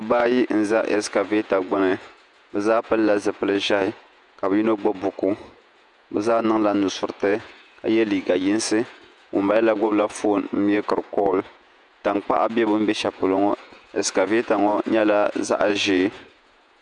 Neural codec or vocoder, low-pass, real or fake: vocoder, 48 kHz, 128 mel bands, Vocos; 10.8 kHz; fake